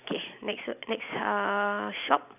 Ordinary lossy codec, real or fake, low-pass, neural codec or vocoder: none; real; 3.6 kHz; none